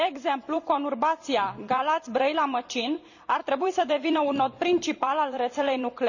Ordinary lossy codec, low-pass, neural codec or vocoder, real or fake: none; 7.2 kHz; vocoder, 44.1 kHz, 128 mel bands every 256 samples, BigVGAN v2; fake